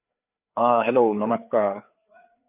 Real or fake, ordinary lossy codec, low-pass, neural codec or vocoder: fake; none; 3.6 kHz; codec, 16 kHz, 4 kbps, FreqCodec, larger model